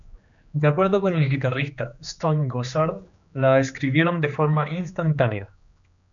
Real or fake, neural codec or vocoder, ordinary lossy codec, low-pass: fake; codec, 16 kHz, 2 kbps, X-Codec, HuBERT features, trained on general audio; MP3, 96 kbps; 7.2 kHz